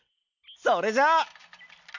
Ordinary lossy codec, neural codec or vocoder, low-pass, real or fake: none; none; 7.2 kHz; real